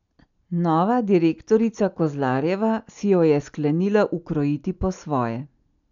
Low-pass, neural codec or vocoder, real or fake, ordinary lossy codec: 7.2 kHz; none; real; none